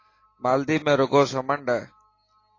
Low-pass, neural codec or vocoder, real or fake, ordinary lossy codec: 7.2 kHz; none; real; AAC, 32 kbps